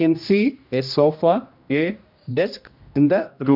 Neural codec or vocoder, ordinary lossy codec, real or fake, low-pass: codec, 16 kHz, 1 kbps, X-Codec, HuBERT features, trained on general audio; MP3, 48 kbps; fake; 5.4 kHz